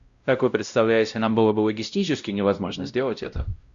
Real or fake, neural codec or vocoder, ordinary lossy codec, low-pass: fake; codec, 16 kHz, 0.5 kbps, X-Codec, WavLM features, trained on Multilingual LibriSpeech; Opus, 64 kbps; 7.2 kHz